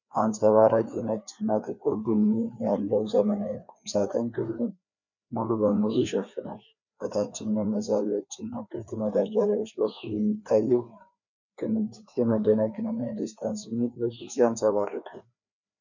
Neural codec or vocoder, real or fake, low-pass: codec, 16 kHz, 2 kbps, FreqCodec, larger model; fake; 7.2 kHz